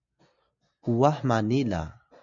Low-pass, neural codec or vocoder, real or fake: 7.2 kHz; none; real